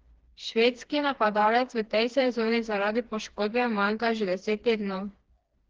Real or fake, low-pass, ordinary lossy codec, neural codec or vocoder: fake; 7.2 kHz; Opus, 16 kbps; codec, 16 kHz, 2 kbps, FreqCodec, smaller model